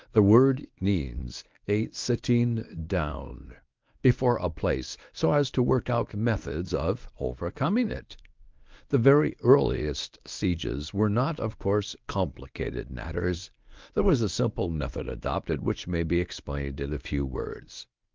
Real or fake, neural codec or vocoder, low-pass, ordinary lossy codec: fake; codec, 24 kHz, 0.9 kbps, WavTokenizer, medium speech release version 1; 7.2 kHz; Opus, 24 kbps